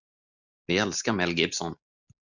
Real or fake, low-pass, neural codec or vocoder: real; 7.2 kHz; none